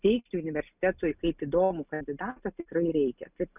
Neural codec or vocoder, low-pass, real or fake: none; 3.6 kHz; real